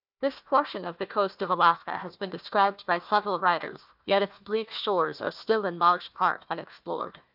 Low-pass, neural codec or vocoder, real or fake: 5.4 kHz; codec, 16 kHz, 1 kbps, FunCodec, trained on Chinese and English, 50 frames a second; fake